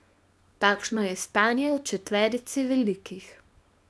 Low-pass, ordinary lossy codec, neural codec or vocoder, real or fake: none; none; codec, 24 kHz, 0.9 kbps, WavTokenizer, small release; fake